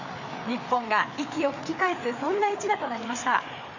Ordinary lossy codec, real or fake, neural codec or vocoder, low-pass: none; fake; codec, 16 kHz, 4 kbps, FreqCodec, larger model; 7.2 kHz